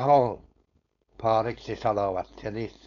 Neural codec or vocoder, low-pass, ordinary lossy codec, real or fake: codec, 16 kHz, 4.8 kbps, FACodec; 7.2 kHz; none; fake